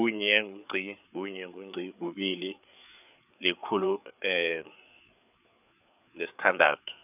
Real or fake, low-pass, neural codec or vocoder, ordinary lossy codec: fake; 3.6 kHz; codec, 16 kHz, 4 kbps, FreqCodec, larger model; none